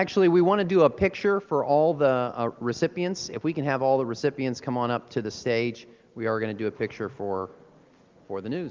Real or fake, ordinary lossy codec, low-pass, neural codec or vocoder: real; Opus, 32 kbps; 7.2 kHz; none